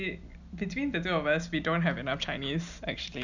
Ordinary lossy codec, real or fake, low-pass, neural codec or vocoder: none; real; 7.2 kHz; none